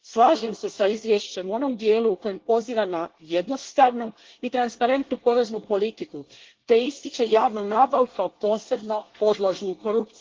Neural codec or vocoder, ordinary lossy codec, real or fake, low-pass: codec, 24 kHz, 1 kbps, SNAC; Opus, 16 kbps; fake; 7.2 kHz